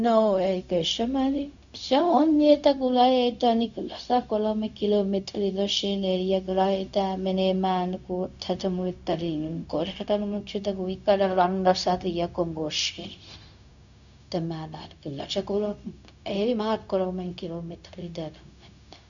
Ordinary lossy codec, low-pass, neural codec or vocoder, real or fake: none; 7.2 kHz; codec, 16 kHz, 0.4 kbps, LongCat-Audio-Codec; fake